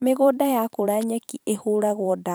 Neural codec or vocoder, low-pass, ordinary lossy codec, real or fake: vocoder, 44.1 kHz, 128 mel bands every 512 samples, BigVGAN v2; none; none; fake